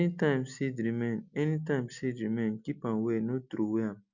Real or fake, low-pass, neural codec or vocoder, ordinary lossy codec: real; 7.2 kHz; none; none